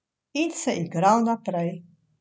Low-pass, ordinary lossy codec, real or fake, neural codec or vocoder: none; none; real; none